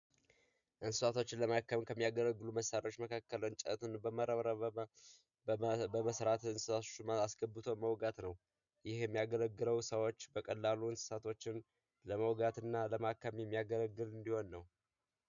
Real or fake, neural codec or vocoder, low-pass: real; none; 7.2 kHz